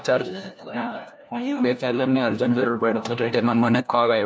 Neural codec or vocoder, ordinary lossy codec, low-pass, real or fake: codec, 16 kHz, 1 kbps, FunCodec, trained on LibriTTS, 50 frames a second; none; none; fake